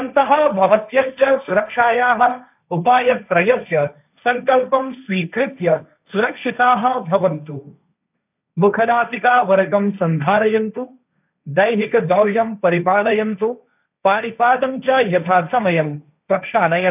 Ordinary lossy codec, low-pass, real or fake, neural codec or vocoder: none; 3.6 kHz; fake; codec, 16 kHz, 1.1 kbps, Voila-Tokenizer